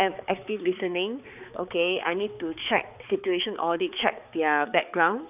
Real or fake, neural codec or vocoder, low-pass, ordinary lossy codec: fake; codec, 16 kHz, 4 kbps, X-Codec, HuBERT features, trained on balanced general audio; 3.6 kHz; none